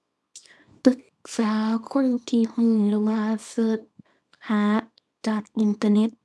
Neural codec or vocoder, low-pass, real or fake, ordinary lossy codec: codec, 24 kHz, 0.9 kbps, WavTokenizer, small release; none; fake; none